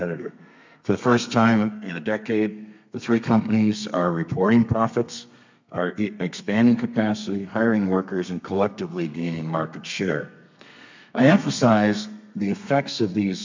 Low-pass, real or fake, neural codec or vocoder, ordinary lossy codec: 7.2 kHz; fake; codec, 32 kHz, 1.9 kbps, SNAC; MP3, 64 kbps